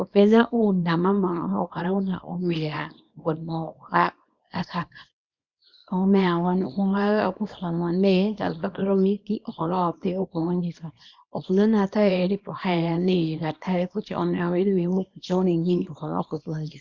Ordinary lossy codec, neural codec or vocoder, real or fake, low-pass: Opus, 64 kbps; codec, 24 kHz, 0.9 kbps, WavTokenizer, small release; fake; 7.2 kHz